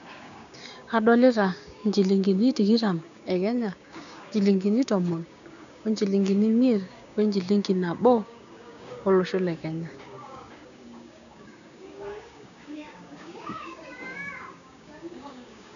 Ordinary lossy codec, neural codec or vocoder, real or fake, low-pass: none; codec, 16 kHz, 6 kbps, DAC; fake; 7.2 kHz